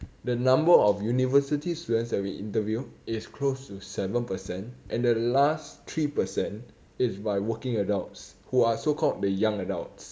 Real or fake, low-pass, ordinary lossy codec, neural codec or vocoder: real; none; none; none